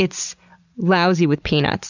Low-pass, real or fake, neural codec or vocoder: 7.2 kHz; real; none